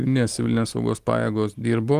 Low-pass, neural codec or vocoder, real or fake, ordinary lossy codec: 14.4 kHz; none; real; Opus, 24 kbps